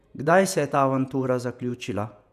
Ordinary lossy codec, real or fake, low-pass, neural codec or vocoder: none; fake; 14.4 kHz; vocoder, 48 kHz, 128 mel bands, Vocos